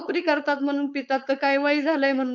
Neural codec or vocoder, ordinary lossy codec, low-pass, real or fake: codec, 16 kHz, 4.8 kbps, FACodec; none; 7.2 kHz; fake